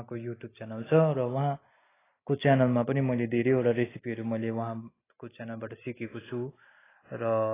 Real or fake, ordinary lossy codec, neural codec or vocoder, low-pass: real; AAC, 16 kbps; none; 3.6 kHz